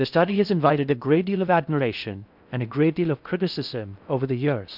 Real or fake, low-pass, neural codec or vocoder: fake; 5.4 kHz; codec, 16 kHz in and 24 kHz out, 0.6 kbps, FocalCodec, streaming, 4096 codes